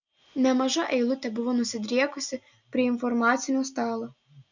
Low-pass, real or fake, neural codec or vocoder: 7.2 kHz; real; none